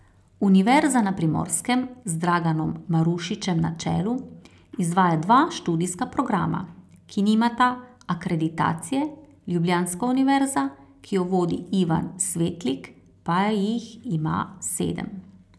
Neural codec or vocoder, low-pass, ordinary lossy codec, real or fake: none; none; none; real